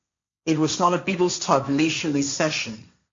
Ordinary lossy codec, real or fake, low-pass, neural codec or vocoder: MP3, 48 kbps; fake; 7.2 kHz; codec, 16 kHz, 1.1 kbps, Voila-Tokenizer